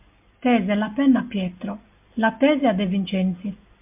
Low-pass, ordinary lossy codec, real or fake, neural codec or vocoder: 3.6 kHz; MP3, 32 kbps; real; none